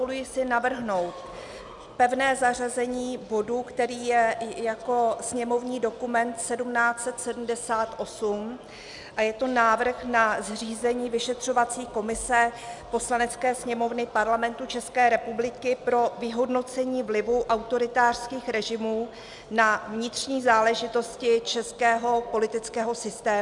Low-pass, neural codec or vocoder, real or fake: 10.8 kHz; none; real